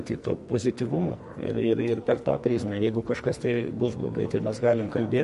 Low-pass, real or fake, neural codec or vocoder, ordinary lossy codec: 14.4 kHz; fake; codec, 44.1 kHz, 2.6 kbps, SNAC; MP3, 48 kbps